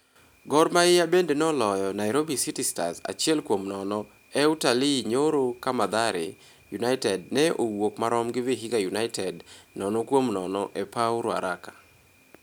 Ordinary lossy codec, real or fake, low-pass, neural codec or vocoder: none; real; none; none